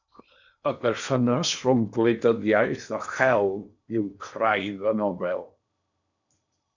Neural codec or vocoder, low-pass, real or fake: codec, 16 kHz in and 24 kHz out, 0.8 kbps, FocalCodec, streaming, 65536 codes; 7.2 kHz; fake